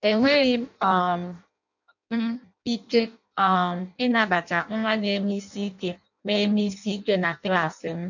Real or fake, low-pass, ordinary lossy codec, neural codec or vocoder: fake; 7.2 kHz; none; codec, 16 kHz in and 24 kHz out, 0.6 kbps, FireRedTTS-2 codec